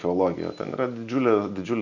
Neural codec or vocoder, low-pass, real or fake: none; 7.2 kHz; real